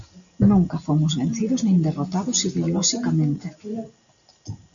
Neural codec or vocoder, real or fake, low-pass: none; real; 7.2 kHz